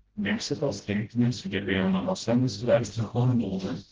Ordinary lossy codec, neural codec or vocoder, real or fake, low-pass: Opus, 16 kbps; codec, 16 kHz, 0.5 kbps, FreqCodec, smaller model; fake; 7.2 kHz